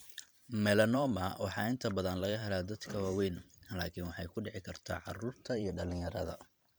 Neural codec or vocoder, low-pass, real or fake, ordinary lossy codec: vocoder, 44.1 kHz, 128 mel bands every 512 samples, BigVGAN v2; none; fake; none